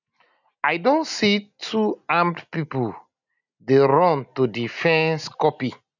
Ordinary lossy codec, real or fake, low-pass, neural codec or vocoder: none; real; 7.2 kHz; none